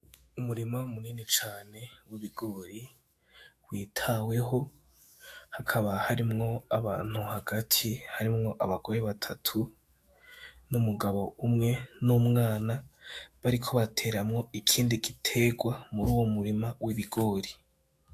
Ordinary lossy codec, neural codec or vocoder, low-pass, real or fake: AAC, 64 kbps; autoencoder, 48 kHz, 128 numbers a frame, DAC-VAE, trained on Japanese speech; 14.4 kHz; fake